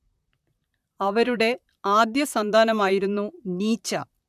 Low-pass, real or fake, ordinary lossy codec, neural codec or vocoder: 14.4 kHz; fake; none; vocoder, 44.1 kHz, 128 mel bands, Pupu-Vocoder